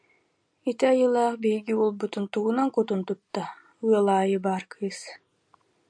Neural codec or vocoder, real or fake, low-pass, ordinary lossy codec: none; real; 9.9 kHz; AAC, 64 kbps